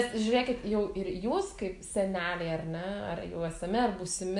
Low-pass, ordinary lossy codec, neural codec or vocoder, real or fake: 10.8 kHz; AAC, 64 kbps; none; real